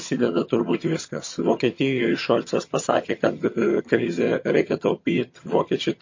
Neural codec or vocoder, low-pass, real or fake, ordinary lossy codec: vocoder, 22.05 kHz, 80 mel bands, HiFi-GAN; 7.2 kHz; fake; MP3, 32 kbps